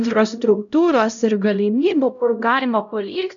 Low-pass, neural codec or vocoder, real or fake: 7.2 kHz; codec, 16 kHz, 0.5 kbps, X-Codec, HuBERT features, trained on LibriSpeech; fake